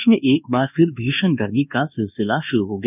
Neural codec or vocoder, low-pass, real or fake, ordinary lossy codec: codec, 24 kHz, 1.2 kbps, DualCodec; 3.6 kHz; fake; none